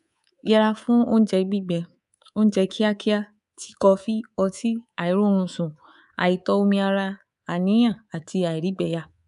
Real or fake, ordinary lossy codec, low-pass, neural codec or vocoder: fake; none; 10.8 kHz; codec, 24 kHz, 3.1 kbps, DualCodec